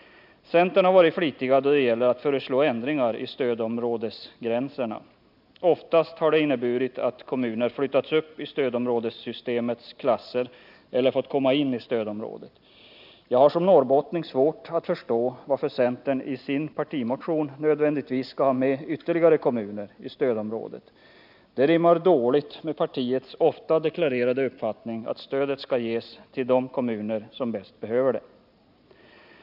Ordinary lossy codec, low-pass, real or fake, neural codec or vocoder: none; 5.4 kHz; real; none